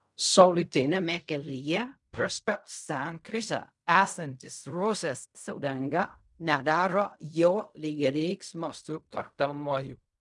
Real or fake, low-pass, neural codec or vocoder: fake; 10.8 kHz; codec, 16 kHz in and 24 kHz out, 0.4 kbps, LongCat-Audio-Codec, fine tuned four codebook decoder